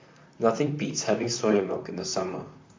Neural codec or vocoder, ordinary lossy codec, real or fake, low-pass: vocoder, 44.1 kHz, 128 mel bands, Pupu-Vocoder; MP3, 48 kbps; fake; 7.2 kHz